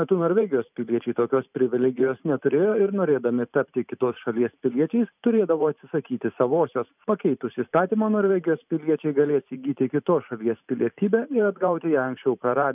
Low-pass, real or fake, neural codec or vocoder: 3.6 kHz; fake; vocoder, 44.1 kHz, 128 mel bands every 512 samples, BigVGAN v2